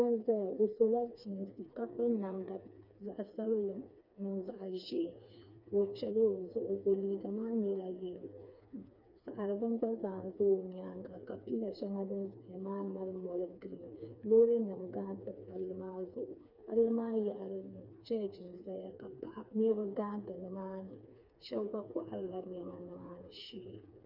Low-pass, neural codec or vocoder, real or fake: 5.4 kHz; codec, 16 kHz, 4 kbps, FreqCodec, smaller model; fake